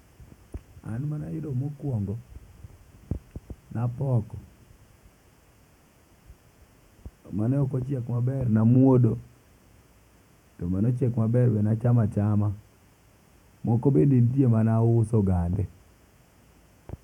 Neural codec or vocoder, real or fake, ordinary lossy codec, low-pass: vocoder, 48 kHz, 128 mel bands, Vocos; fake; none; 19.8 kHz